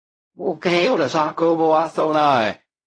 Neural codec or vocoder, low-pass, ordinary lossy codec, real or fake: codec, 16 kHz in and 24 kHz out, 0.4 kbps, LongCat-Audio-Codec, fine tuned four codebook decoder; 9.9 kHz; AAC, 32 kbps; fake